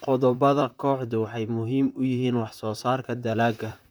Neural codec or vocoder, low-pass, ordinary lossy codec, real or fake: vocoder, 44.1 kHz, 128 mel bands, Pupu-Vocoder; none; none; fake